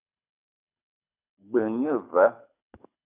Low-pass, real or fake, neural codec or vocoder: 3.6 kHz; fake; codec, 24 kHz, 6 kbps, HILCodec